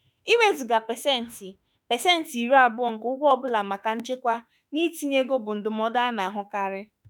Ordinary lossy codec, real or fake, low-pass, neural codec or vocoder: none; fake; none; autoencoder, 48 kHz, 32 numbers a frame, DAC-VAE, trained on Japanese speech